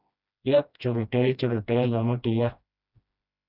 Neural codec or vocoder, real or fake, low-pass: codec, 16 kHz, 1 kbps, FreqCodec, smaller model; fake; 5.4 kHz